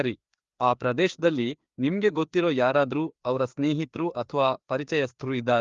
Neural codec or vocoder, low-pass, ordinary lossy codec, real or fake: codec, 16 kHz, 2 kbps, FreqCodec, larger model; 7.2 kHz; Opus, 32 kbps; fake